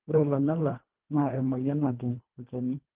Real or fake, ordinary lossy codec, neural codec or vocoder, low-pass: fake; Opus, 16 kbps; codec, 24 kHz, 1.5 kbps, HILCodec; 3.6 kHz